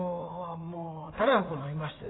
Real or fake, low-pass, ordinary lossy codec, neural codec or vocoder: fake; 7.2 kHz; AAC, 16 kbps; codec, 16 kHz in and 24 kHz out, 2.2 kbps, FireRedTTS-2 codec